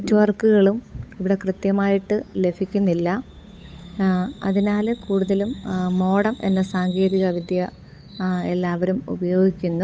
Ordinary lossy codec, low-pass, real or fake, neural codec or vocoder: none; none; fake; codec, 16 kHz, 8 kbps, FunCodec, trained on Chinese and English, 25 frames a second